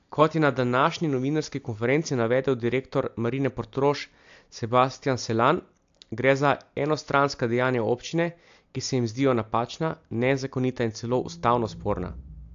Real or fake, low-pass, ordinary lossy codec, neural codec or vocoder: real; 7.2 kHz; AAC, 64 kbps; none